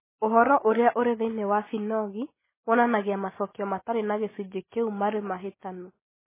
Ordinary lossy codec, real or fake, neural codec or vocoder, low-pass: MP3, 16 kbps; real; none; 3.6 kHz